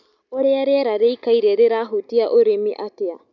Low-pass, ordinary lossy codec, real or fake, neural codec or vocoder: 7.2 kHz; none; real; none